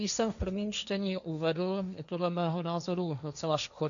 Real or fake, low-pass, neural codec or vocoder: fake; 7.2 kHz; codec, 16 kHz, 1.1 kbps, Voila-Tokenizer